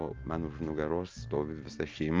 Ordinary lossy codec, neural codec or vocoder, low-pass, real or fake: Opus, 32 kbps; none; 7.2 kHz; real